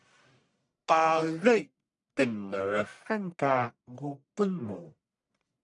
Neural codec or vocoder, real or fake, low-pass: codec, 44.1 kHz, 1.7 kbps, Pupu-Codec; fake; 10.8 kHz